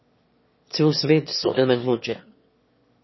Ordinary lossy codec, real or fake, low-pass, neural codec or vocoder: MP3, 24 kbps; fake; 7.2 kHz; autoencoder, 22.05 kHz, a latent of 192 numbers a frame, VITS, trained on one speaker